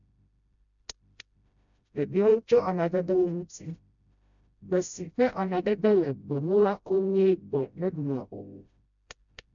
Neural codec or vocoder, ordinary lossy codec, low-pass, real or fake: codec, 16 kHz, 0.5 kbps, FreqCodec, smaller model; none; 7.2 kHz; fake